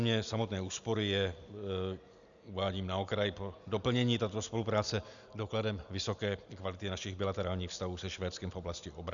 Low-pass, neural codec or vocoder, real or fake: 7.2 kHz; none; real